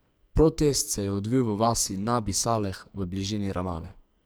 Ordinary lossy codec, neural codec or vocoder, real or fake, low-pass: none; codec, 44.1 kHz, 2.6 kbps, SNAC; fake; none